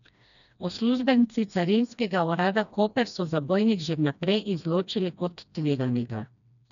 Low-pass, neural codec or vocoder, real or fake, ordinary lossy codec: 7.2 kHz; codec, 16 kHz, 1 kbps, FreqCodec, smaller model; fake; none